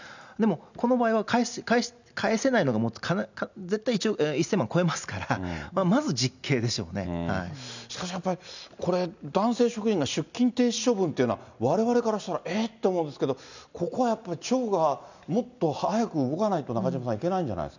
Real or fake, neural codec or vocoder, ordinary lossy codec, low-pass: real; none; none; 7.2 kHz